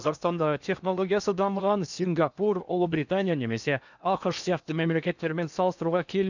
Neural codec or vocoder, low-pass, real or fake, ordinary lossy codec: codec, 16 kHz in and 24 kHz out, 0.8 kbps, FocalCodec, streaming, 65536 codes; 7.2 kHz; fake; none